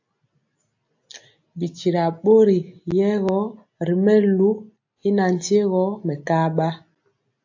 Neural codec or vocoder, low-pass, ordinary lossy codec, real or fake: none; 7.2 kHz; AAC, 48 kbps; real